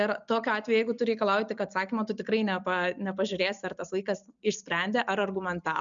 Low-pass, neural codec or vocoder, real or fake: 7.2 kHz; none; real